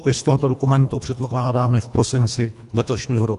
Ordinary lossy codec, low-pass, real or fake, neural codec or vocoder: AAC, 96 kbps; 10.8 kHz; fake; codec, 24 kHz, 1.5 kbps, HILCodec